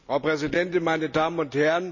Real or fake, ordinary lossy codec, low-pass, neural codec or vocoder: real; none; 7.2 kHz; none